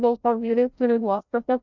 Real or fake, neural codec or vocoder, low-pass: fake; codec, 16 kHz, 0.5 kbps, FreqCodec, larger model; 7.2 kHz